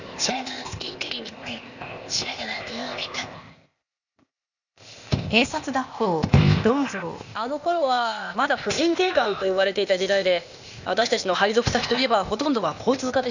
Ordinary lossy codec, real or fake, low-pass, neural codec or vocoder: none; fake; 7.2 kHz; codec, 16 kHz, 0.8 kbps, ZipCodec